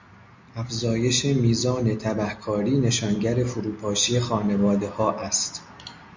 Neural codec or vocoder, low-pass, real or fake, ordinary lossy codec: none; 7.2 kHz; real; MP3, 64 kbps